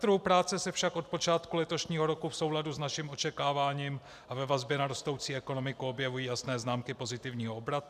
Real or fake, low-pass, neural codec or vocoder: real; 14.4 kHz; none